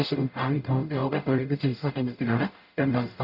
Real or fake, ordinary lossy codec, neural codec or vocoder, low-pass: fake; none; codec, 44.1 kHz, 0.9 kbps, DAC; 5.4 kHz